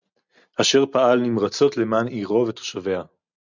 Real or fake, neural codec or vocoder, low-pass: real; none; 7.2 kHz